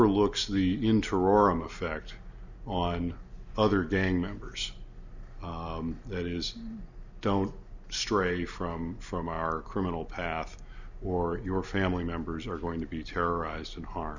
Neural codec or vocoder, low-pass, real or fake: none; 7.2 kHz; real